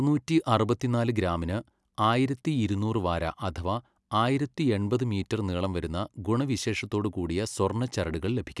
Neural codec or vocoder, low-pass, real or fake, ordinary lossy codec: none; none; real; none